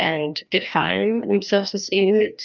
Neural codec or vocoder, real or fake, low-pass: codec, 16 kHz, 1 kbps, FreqCodec, larger model; fake; 7.2 kHz